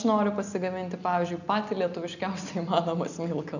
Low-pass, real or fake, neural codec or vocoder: 7.2 kHz; real; none